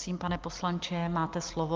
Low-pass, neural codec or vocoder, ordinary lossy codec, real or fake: 7.2 kHz; none; Opus, 24 kbps; real